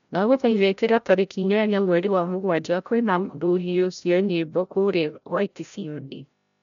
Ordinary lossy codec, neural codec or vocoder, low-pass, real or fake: none; codec, 16 kHz, 0.5 kbps, FreqCodec, larger model; 7.2 kHz; fake